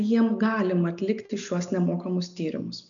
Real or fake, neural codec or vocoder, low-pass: real; none; 7.2 kHz